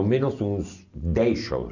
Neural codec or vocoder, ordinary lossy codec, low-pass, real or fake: none; none; 7.2 kHz; real